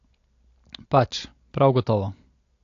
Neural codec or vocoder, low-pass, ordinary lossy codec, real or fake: none; 7.2 kHz; AAC, 48 kbps; real